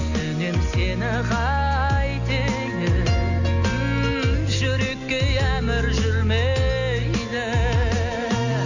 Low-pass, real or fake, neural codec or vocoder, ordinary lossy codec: 7.2 kHz; real; none; none